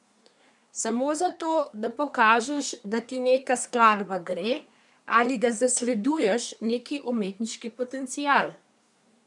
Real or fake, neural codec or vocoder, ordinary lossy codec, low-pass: fake; codec, 24 kHz, 1 kbps, SNAC; none; 10.8 kHz